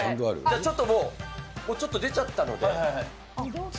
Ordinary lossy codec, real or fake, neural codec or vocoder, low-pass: none; real; none; none